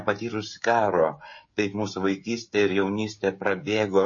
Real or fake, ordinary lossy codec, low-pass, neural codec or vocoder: fake; MP3, 32 kbps; 7.2 kHz; codec, 16 kHz, 16 kbps, FreqCodec, smaller model